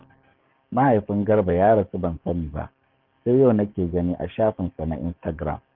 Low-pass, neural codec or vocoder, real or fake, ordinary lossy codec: 5.4 kHz; codec, 44.1 kHz, 7.8 kbps, DAC; fake; Opus, 32 kbps